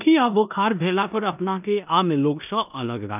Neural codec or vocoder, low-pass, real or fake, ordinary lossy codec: codec, 16 kHz in and 24 kHz out, 0.9 kbps, LongCat-Audio-Codec, four codebook decoder; 3.6 kHz; fake; none